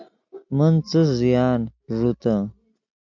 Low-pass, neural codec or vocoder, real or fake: 7.2 kHz; none; real